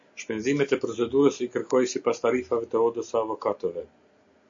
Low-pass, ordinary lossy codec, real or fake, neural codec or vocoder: 7.2 kHz; MP3, 48 kbps; real; none